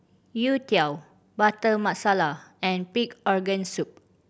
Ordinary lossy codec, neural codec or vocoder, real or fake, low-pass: none; none; real; none